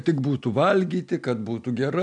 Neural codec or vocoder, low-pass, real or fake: none; 9.9 kHz; real